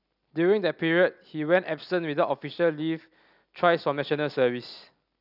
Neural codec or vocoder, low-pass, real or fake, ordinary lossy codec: none; 5.4 kHz; real; none